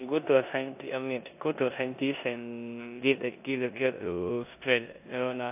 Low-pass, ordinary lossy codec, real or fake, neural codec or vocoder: 3.6 kHz; AAC, 32 kbps; fake; codec, 16 kHz in and 24 kHz out, 0.9 kbps, LongCat-Audio-Codec, four codebook decoder